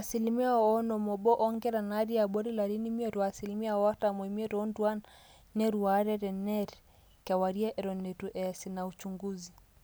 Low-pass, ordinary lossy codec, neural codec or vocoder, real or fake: none; none; none; real